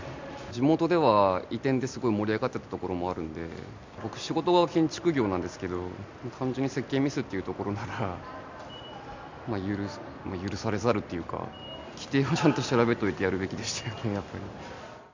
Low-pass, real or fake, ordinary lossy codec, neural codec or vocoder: 7.2 kHz; real; MP3, 64 kbps; none